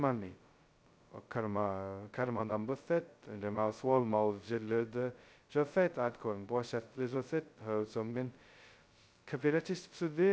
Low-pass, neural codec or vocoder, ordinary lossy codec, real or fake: none; codec, 16 kHz, 0.2 kbps, FocalCodec; none; fake